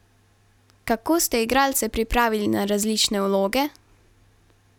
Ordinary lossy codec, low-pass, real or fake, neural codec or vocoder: none; 19.8 kHz; real; none